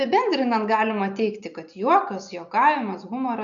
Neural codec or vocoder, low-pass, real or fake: none; 7.2 kHz; real